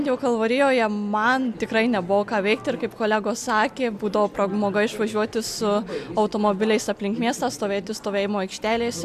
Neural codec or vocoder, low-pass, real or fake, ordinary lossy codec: none; 14.4 kHz; real; AAC, 96 kbps